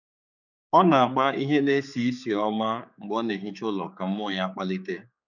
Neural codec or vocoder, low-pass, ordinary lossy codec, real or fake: codec, 16 kHz, 4 kbps, X-Codec, HuBERT features, trained on general audio; 7.2 kHz; none; fake